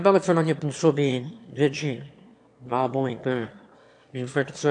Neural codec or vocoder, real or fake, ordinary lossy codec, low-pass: autoencoder, 22.05 kHz, a latent of 192 numbers a frame, VITS, trained on one speaker; fake; AAC, 64 kbps; 9.9 kHz